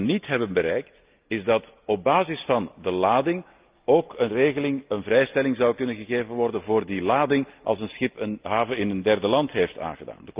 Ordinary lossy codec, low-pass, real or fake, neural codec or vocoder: Opus, 24 kbps; 3.6 kHz; real; none